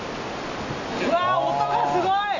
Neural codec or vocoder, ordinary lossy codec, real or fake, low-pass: none; none; real; 7.2 kHz